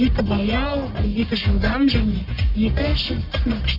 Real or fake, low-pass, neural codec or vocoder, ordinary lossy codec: fake; 5.4 kHz; codec, 44.1 kHz, 1.7 kbps, Pupu-Codec; none